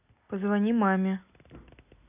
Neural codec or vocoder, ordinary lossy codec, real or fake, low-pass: none; none; real; 3.6 kHz